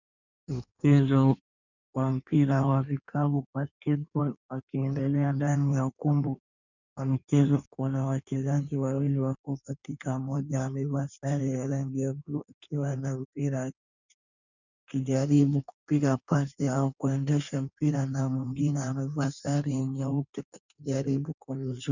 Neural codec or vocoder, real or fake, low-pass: codec, 16 kHz in and 24 kHz out, 1.1 kbps, FireRedTTS-2 codec; fake; 7.2 kHz